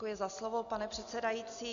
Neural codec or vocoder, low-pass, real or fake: none; 7.2 kHz; real